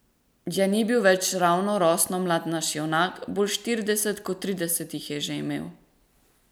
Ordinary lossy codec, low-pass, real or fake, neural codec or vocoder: none; none; real; none